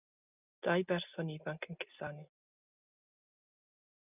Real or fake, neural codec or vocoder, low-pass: real; none; 3.6 kHz